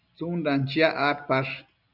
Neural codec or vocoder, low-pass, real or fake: none; 5.4 kHz; real